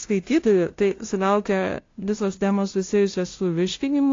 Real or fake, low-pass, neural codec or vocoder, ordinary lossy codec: fake; 7.2 kHz; codec, 16 kHz, 0.5 kbps, FunCodec, trained on LibriTTS, 25 frames a second; AAC, 32 kbps